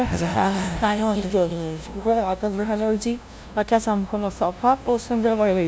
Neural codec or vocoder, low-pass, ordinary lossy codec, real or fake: codec, 16 kHz, 0.5 kbps, FunCodec, trained on LibriTTS, 25 frames a second; none; none; fake